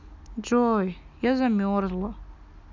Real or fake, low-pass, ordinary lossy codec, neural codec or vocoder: real; 7.2 kHz; none; none